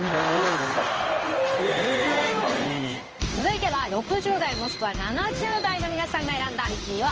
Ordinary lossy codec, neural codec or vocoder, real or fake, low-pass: Opus, 24 kbps; codec, 16 kHz in and 24 kHz out, 1 kbps, XY-Tokenizer; fake; 7.2 kHz